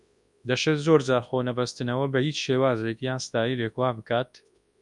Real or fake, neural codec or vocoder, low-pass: fake; codec, 24 kHz, 0.9 kbps, WavTokenizer, large speech release; 10.8 kHz